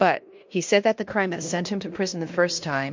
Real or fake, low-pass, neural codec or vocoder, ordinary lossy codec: fake; 7.2 kHz; codec, 16 kHz in and 24 kHz out, 0.9 kbps, LongCat-Audio-Codec, fine tuned four codebook decoder; MP3, 48 kbps